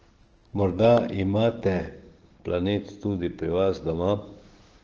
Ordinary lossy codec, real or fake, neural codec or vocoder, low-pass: Opus, 16 kbps; fake; codec, 16 kHz, 6 kbps, DAC; 7.2 kHz